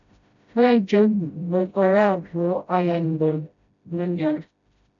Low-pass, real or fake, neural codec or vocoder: 7.2 kHz; fake; codec, 16 kHz, 0.5 kbps, FreqCodec, smaller model